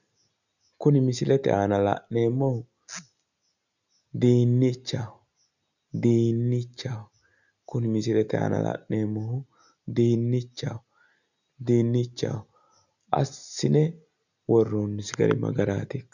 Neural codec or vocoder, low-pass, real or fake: none; 7.2 kHz; real